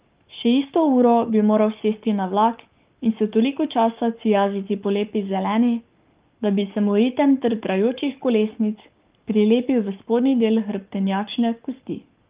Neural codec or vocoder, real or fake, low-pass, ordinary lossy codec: codec, 44.1 kHz, 7.8 kbps, Pupu-Codec; fake; 3.6 kHz; Opus, 32 kbps